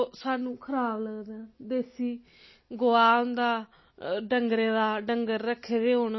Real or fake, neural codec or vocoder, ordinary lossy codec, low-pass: real; none; MP3, 24 kbps; 7.2 kHz